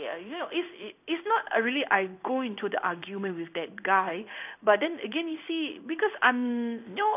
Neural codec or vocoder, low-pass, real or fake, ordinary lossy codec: codec, 16 kHz in and 24 kHz out, 1 kbps, XY-Tokenizer; 3.6 kHz; fake; none